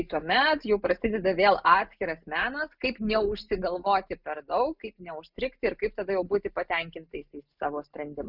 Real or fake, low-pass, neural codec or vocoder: real; 5.4 kHz; none